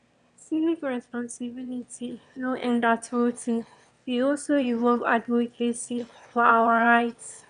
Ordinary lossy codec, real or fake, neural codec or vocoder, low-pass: none; fake; autoencoder, 22.05 kHz, a latent of 192 numbers a frame, VITS, trained on one speaker; 9.9 kHz